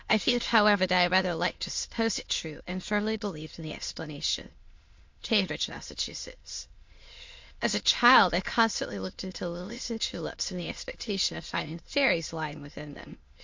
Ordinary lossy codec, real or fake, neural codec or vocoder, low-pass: MP3, 48 kbps; fake; autoencoder, 22.05 kHz, a latent of 192 numbers a frame, VITS, trained on many speakers; 7.2 kHz